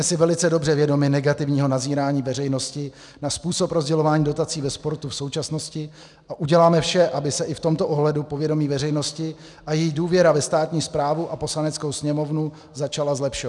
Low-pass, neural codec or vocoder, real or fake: 10.8 kHz; none; real